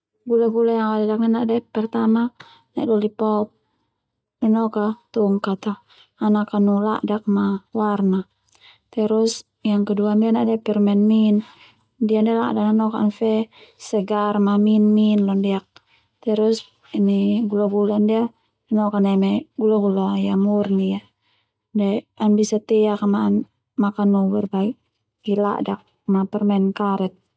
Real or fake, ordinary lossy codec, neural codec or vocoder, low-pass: real; none; none; none